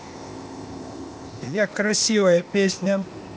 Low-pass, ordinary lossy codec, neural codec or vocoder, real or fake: none; none; codec, 16 kHz, 0.8 kbps, ZipCodec; fake